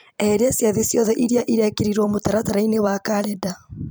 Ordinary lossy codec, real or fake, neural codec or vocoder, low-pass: none; fake; vocoder, 44.1 kHz, 128 mel bands every 256 samples, BigVGAN v2; none